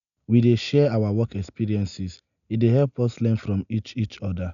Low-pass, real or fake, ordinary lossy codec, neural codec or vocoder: 7.2 kHz; real; none; none